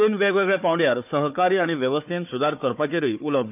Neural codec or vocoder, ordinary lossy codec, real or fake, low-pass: codec, 16 kHz, 4 kbps, FunCodec, trained on Chinese and English, 50 frames a second; none; fake; 3.6 kHz